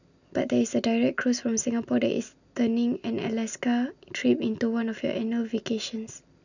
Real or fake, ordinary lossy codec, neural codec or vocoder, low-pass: real; none; none; 7.2 kHz